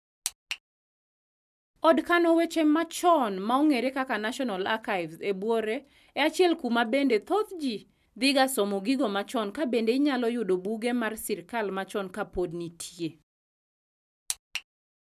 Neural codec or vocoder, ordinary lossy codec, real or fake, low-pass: none; none; real; 14.4 kHz